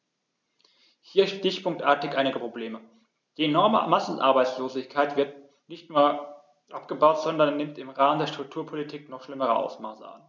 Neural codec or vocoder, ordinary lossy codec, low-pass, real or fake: none; none; none; real